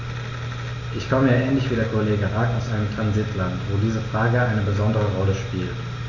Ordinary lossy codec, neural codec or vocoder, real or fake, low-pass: none; none; real; 7.2 kHz